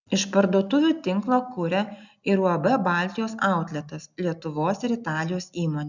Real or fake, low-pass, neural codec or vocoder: real; 7.2 kHz; none